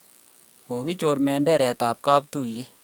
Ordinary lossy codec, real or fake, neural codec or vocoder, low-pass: none; fake; codec, 44.1 kHz, 2.6 kbps, SNAC; none